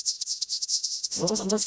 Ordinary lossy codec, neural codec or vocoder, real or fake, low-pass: none; codec, 16 kHz, 0.5 kbps, FreqCodec, smaller model; fake; none